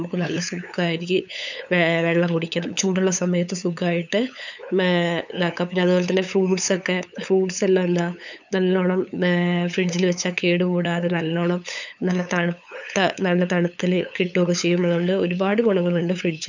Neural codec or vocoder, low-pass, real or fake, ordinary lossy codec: codec, 16 kHz, 8 kbps, FunCodec, trained on LibriTTS, 25 frames a second; 7.2 kHz; fake; none